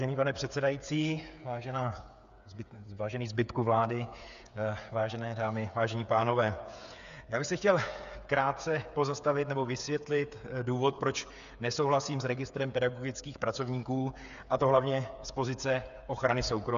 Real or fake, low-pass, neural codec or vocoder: fake; 7.2 kHz; codec, 16 kHz, 8 kbps, FreqCodec, smaller model